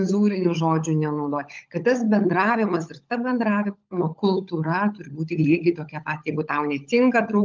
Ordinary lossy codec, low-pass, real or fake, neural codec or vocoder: Opus, 24 kbps; 7.2 kHz; fake; codec, 16 kHz, 16 kbps, FunCodec, trained on LibriTTS, 50 frames a second